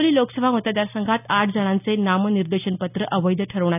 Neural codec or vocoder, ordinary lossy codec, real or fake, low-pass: none; none; real; 3.6 kHz